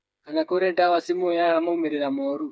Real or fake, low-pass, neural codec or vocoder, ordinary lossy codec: fake; none; codec, 16 kHz, 4 kbps, FreqCodec, smaller model; none